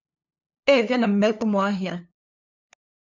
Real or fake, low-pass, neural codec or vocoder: fake; 7.2 kHz; codec, 16 kHz, 2 kbps, FunCodec, trained on LibriTTS, 25 frames a second